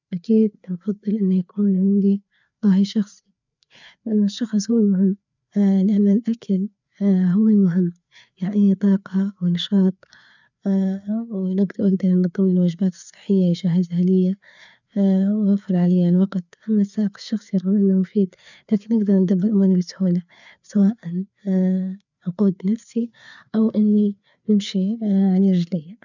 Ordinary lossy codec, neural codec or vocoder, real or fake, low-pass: none; codec, 16 kHz, 4 kbps, FreqCodec, larger model; fake; 7.2 kHz